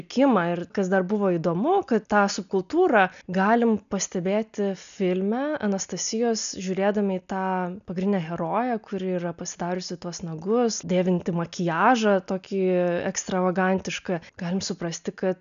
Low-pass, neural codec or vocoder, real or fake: 7.2 kHz; none; real